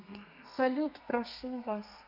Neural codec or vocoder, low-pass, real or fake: codec, 32 kHz, 1.9 kbps, SNAC; 5.4 kHz; fake